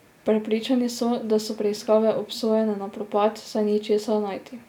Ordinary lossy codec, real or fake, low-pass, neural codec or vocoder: none; real; 19.8 kHz; none